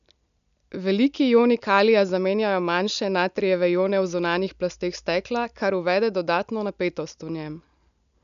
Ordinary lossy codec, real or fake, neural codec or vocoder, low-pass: none; real; none; 7.2 kHz